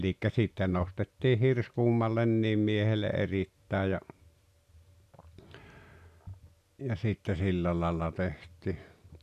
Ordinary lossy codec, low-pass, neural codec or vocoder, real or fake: none; 14.4 kHz; none; real